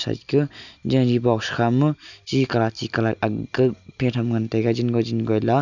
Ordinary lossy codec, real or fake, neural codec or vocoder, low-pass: none; real; none; 7.2 kHz